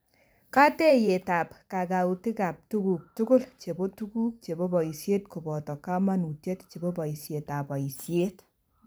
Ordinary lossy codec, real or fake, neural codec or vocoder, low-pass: none; fake; vocoder, 44.1 kHz, 128 mel bands every 512 samples, BigVGAN v2; none